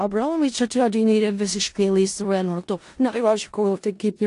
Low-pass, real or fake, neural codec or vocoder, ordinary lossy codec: 10.8 kHz; fake; codec, 16 kHz in and 24 kHz out, 0.4 kbps, LongCat-Audio-Codec, four codebook decoder; AAC, 48 kbps